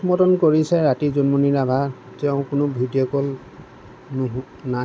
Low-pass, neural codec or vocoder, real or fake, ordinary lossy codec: none; none; real; none